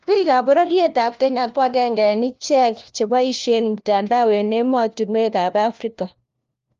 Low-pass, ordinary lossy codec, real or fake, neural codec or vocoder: 7.2 kHz; Opus, 32 kbps; fake; codec, 16 kHz, 1 kbps, FunCodec, trained on LibriTTS, 50 frames a second